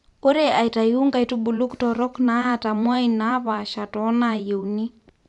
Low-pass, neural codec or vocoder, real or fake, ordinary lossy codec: 10.8 kHz; vocoder, 44.1 kHz, 128 mel bands every 512 samples, BigVGAN v2; fake; none